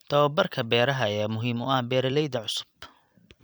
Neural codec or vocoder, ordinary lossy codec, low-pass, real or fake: vocoder, 44.1 kHz, 128 mel bands every 256 samples, BigVGAN v2; none; none; fake